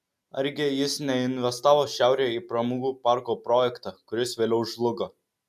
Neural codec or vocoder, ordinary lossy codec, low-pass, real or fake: none; MP3, 96 kbps; 14.4 kHz; real